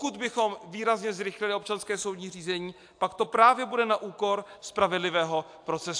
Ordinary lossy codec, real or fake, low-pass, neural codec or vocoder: AAC, 64 kbps; real; 9.9 kHz; none